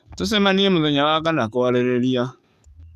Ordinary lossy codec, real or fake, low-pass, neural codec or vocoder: none; fake; 14.4 kHz; codec, 44.1 kHz, 7.8 kbps, DAC